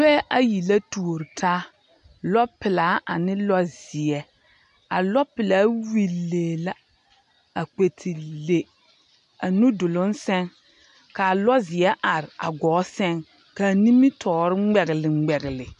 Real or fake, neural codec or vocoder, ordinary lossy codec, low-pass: real; none; MP3, 64 kbps; 10.8 kHz